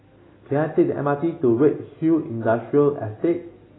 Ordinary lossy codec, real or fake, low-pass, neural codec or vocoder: AAC, 16 kbps; real; 7.2 kHz; none